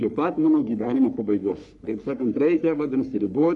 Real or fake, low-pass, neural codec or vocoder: fake; 10.8 kHz; codec, 44.1 kHz, 3.4 kbps, Pupu-Codec